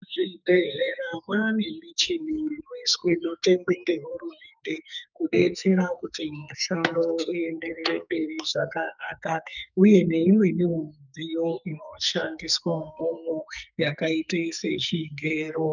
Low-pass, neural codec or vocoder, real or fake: 7.2 kHz; codec, 44.1 kHz, 2.6 kbps, SNAC; fake